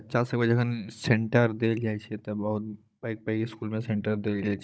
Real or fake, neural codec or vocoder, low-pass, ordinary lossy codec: fake; codec, 16 kHz, 16 kbps, FunCodec, trained on Chinese and English, 50 frames a second; none; none